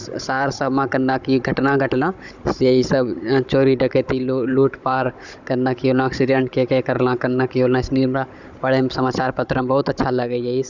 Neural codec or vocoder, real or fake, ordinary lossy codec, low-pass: codec, 16 kHz, 16 kbps, FunCodec, trained on Chinese and English, 50 frames a second; fake; none; 7.2 kHz